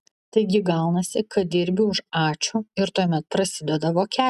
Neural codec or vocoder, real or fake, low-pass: none; real; 14.4 kHz